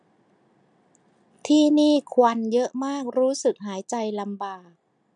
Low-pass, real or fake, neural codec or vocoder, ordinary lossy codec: 9.9 kHz; real; none; none